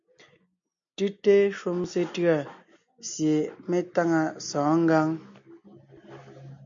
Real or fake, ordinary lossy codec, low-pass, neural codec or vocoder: real; MP3, 64 kbps; 7.2 kHz; none